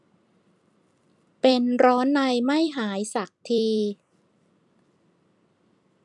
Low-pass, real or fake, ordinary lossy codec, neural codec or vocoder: 10.8 kHz; fake; none; vocoder, 44.1 kHz, 128 mel bands every 256 samples, BigVGAN v2